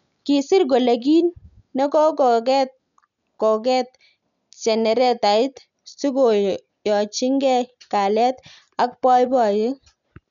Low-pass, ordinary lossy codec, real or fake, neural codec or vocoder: 7.2 kHz; none; real; none